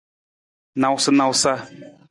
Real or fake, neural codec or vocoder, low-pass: real; none; 10.8 kHz